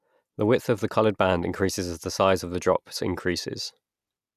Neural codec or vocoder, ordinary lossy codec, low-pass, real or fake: none; none; 14.4 kHz; real